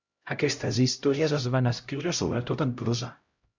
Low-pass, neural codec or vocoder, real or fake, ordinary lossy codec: 7.2 kHz; codec, 16 kHz, 0.5 kbps, X-Codec, HuBERT features, trained on LibriSpeech; fake; Opus, 64 kbps